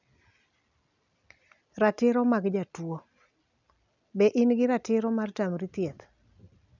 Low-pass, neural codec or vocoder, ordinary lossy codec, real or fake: 7.2 kHz; none; none; real